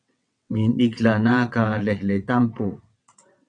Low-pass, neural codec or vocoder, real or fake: 9.9 kHz; vocoder, 22.05 kHz, 80 mel bands, WaveNeXt; fake